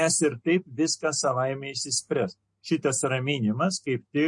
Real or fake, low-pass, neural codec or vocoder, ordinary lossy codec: real; 10.8 kHz; none; MP3, 48 kbps